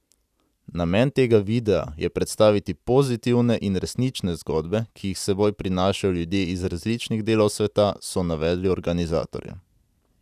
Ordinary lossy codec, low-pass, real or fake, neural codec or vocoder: none; 14.4 kHz; real; none